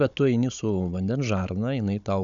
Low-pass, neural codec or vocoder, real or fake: 7.2 kHz; codec, 16 kHz, 16 kbps, FunCodec, trained on Chinese and English, 50 frames a second; fake